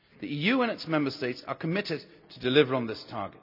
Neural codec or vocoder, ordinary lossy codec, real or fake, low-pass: none; none; real; 5.4 kHz